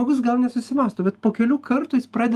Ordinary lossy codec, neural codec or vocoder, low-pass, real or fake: Opus, 32 kbps; none; 14.4 kHz; real